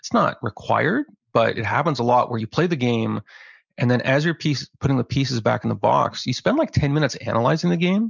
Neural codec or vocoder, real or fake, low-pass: none; real; 7.2 kHz